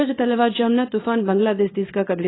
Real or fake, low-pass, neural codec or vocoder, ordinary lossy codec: fake; 7.2 kHz; codec, 24 kHz, 0.9 kbps, WavTokenizer, small release; AAC, 16 kbps